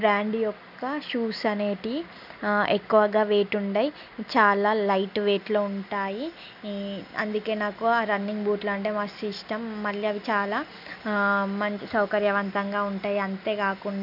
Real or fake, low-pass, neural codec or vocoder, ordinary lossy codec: real; 5.4 kHz; none; none